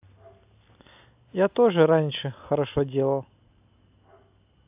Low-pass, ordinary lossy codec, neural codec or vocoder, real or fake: 3.6 kHz; AAC, 32 kbps; none; real